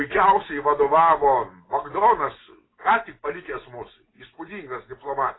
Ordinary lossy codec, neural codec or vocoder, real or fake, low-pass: AAC, 16 kbps; none; real; 7.2 kHz